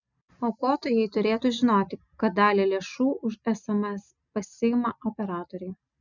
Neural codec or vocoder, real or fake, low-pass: none; real; 7.2 kHz